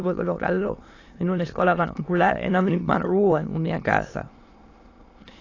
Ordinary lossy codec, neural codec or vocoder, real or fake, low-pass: AAC, 32 kbps; autoencoder, 22.05 kHz, a latent of 192 numbers a frame, VITS, trained on many speakers; fake; 7.2 kHz